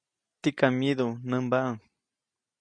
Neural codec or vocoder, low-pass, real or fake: none; 9.9 kHz; real